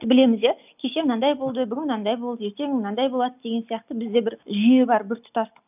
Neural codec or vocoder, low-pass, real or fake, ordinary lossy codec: none; 3.6 kHz; real; none